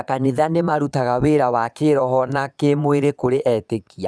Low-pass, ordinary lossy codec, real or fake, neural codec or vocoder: none; none; fake; vocoder, 22.05 kHz, 80 mel bands, Vocos